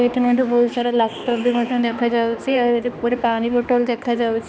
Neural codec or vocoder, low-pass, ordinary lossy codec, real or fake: codec, 16 kHz, 2 kbps, X-Codec, HuBERT features, trained on balanced general audio; none; none; fake